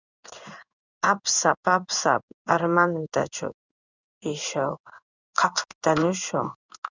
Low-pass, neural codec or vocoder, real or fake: 7.2 kHz; codec, 16 kHz in and 24 kHz out, 1 kbps, XY-Tokenizer; fake